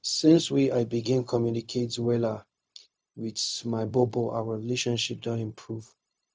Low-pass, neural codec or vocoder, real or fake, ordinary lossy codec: none; codec, 16 kHz, 0.4 kbps, LongCat-Audio-Codec; fake; none